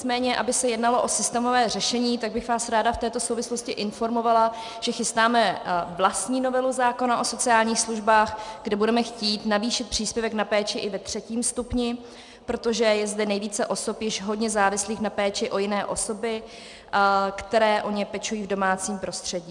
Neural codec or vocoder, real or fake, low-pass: none; real; 10.8 kHz